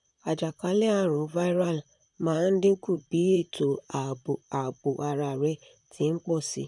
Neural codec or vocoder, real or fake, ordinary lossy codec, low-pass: vocoder, 44.1 kHz, 128 mel bands, Pupu-Vocoder; fake; none; 10.8 kHz